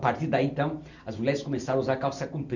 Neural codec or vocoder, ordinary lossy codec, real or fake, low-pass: none; none; real; 7.2 kHz